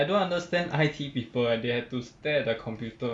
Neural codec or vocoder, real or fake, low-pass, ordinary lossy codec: none; real; 9.9 kHz; none